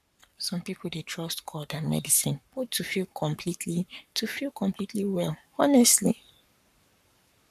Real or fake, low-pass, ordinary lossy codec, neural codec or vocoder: fake; 14.4 kHz; none; codec, 44.1 kHz, 7.8 kbps, Pupu-Codec